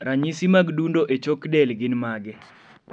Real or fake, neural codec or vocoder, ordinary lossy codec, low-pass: real; none; none; 9.9 kHz